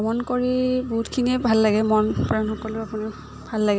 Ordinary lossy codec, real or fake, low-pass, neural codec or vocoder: none; real; none; none